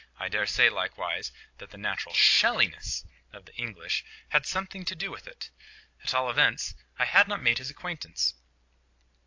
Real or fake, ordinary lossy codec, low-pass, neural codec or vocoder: real; AAC, 48 kbps; 7.2 kHz; none